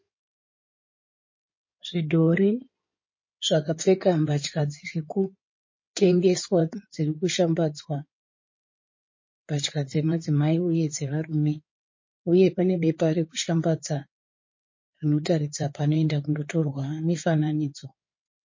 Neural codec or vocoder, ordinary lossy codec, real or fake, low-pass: codec, 16 kHz in and 24 kHz out, 2.2 kbps, FireRedTTS-2 codec; MP3, 32 kbps; fake; 7.2 kHz